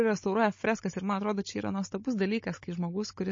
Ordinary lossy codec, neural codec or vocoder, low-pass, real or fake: MP3, 32 kbps; codec, 16 kHz, 16 kbps, FunCodec, trained on Chinese and English, 50 frames a second; 7.2 kHz; fake